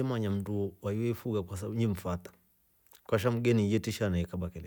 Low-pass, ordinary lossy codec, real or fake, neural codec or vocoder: none; none; real; none